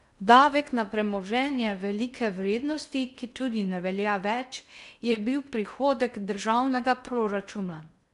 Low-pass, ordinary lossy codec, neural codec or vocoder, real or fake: 10.8 kHz; none; codec, 16 kHz in and 24 kHz out, 0.6 kbps, FocalCodec, streaming, 2048 codes; fake